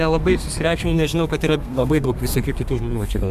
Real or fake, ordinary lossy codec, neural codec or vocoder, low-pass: fake; MP3, 96 kbps; codec, 32 kHz, 1.9 kbps, SNAC; 14.4 kHz